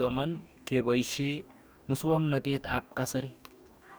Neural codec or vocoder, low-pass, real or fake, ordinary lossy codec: codec, 44.1 kHz, 2.6 kbps, DAC; none; fake; none